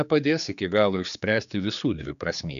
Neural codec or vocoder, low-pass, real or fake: codec, 16 kHz, 4 kbps, X-Codec, HuBERT features, trained on general audio; 7.2 kHz; fake